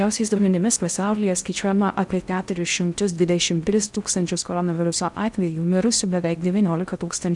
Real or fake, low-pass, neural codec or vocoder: fake; 10.8 kHz; codec, 16 kHz in and 24 kHz out, 0.6 kbps, FocalCodec, streaming, 4096 codes